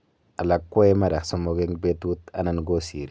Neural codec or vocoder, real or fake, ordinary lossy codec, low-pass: none; real; none; none